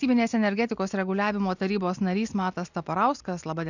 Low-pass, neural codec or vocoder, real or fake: 7.2 kHz; none; real